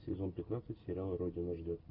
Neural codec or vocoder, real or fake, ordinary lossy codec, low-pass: none; real; AAC, 32 kbps; 5.4 kHz